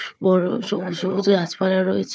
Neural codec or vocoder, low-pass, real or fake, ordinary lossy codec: codec, 16 kHz, 4 kbps, FunCodec, trained on Chinese and English, 50 frames a second; none; fake; none